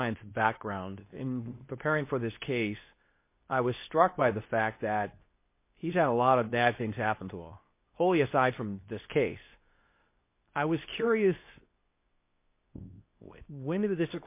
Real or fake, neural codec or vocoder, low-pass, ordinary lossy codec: fake; codec, 24 kHz, 0.9 kbps, WavTokenizer, medium speech release version 2; 3.6 kHz; MP3, 24 kbps